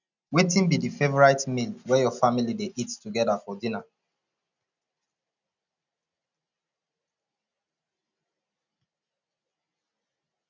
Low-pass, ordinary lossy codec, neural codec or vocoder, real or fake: 7.2 kHz; none; none; real